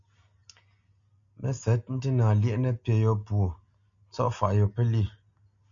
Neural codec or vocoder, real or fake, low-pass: none; real; 7.2 kHz